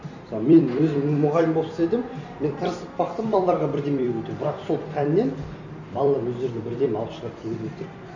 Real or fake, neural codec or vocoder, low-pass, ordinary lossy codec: fake; vocoder, 44.1 kHz, 128 mel bands every 256 samples, BigVGAN v2; 7.2 kHz; none